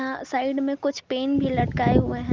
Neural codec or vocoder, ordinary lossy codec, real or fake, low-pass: none; Opus, 32 kbps; real; 7.2 kHz